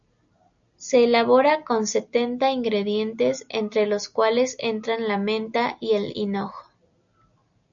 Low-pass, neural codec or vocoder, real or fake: 7.2 kHz; none; real